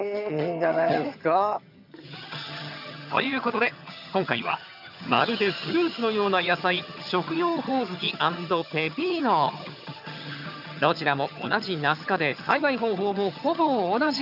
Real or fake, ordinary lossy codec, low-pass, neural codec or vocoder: fake; none; 5.4 kHz; vocoder, 22.05 kHz, 80 mel bands, HiFi-GAN